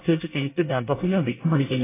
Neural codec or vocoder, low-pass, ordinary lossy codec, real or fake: codec, 24 kHz, 1 kbps, SNAC; 3.6 kHz; AAC, 16 kbps; fake